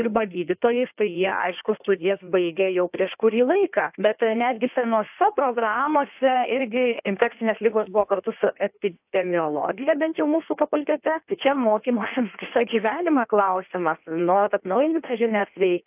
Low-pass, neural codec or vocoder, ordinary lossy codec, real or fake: 3.6 kHz; codec, 16 kHz in and 24 kHz out, 1.1 kbps, FireRedTTS-2 codec; AAC, 32 kbps; fake